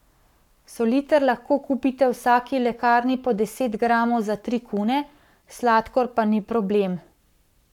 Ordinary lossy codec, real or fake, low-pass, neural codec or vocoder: none; fake; 19.8 kHz; codec, 44.1 kHz, 7.8 kbps, Pupu-Codec